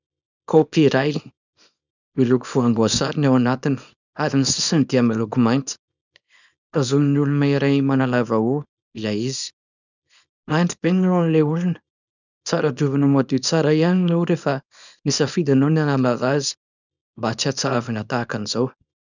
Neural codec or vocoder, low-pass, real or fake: codec, 24 kHz, 0.9 kbps, WavTokenizer, small release; 7.2 kHz; fake